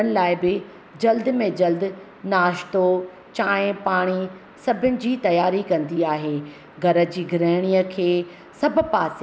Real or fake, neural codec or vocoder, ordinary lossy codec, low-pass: real; none; none; none